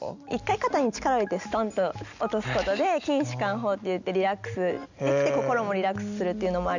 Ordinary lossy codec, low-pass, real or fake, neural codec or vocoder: none; 7.2 kHz; real; none